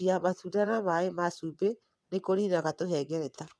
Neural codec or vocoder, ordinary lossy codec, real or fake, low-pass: vocoder, 22.05 kHz, 80 mel bands, WaveNeXt; none; fake; none